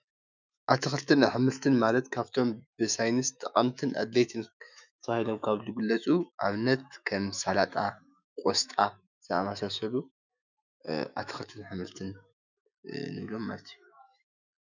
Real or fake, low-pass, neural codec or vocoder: fake; 7.2 kHz; autoencoder, 48 kHz, 128 numbers a frame, DAC-VAE, trained on Japanese speech